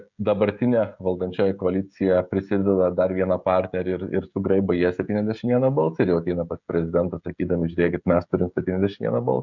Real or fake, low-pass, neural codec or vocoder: fake; 7.2 kHz; codec, 16 kHz, 16 kbps, FreqCodec, smaller model